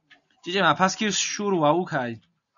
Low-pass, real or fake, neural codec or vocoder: 7.2 kHz; real; none